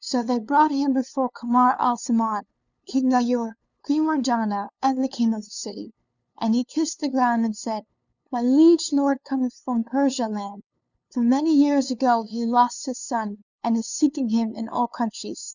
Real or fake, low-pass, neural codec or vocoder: fake; 7.2 kHz; codec, 16 kHz, 2 kbps, FunCodec, trained on LibriTTS, 25 frames a second